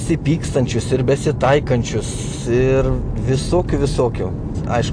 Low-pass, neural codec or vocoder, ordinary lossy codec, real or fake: 9.9 kHz; none; AAC, 64 kbps; real